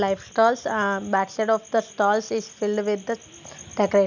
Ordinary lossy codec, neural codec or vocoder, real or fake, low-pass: none; none; real; 7.2 kHz